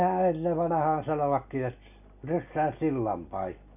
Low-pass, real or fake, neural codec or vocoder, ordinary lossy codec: 3.6 kHz; real; none; none